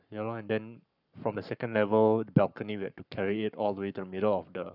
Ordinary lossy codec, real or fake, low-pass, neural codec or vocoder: none; fake; 5.4 kHz; codec, 44.1 kHz, 7.8 kbps, Pupu-Codec